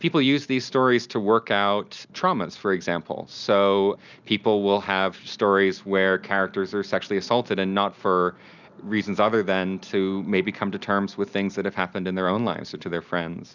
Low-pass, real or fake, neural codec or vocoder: 7.2 kHz; real; none